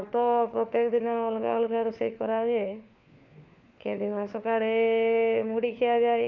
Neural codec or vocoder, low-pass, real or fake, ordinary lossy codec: codec, 16 kHz, 4 kbps, FunCodec, trained on LibriTTS, 50 frames a second; 7.2 kHz; fake; none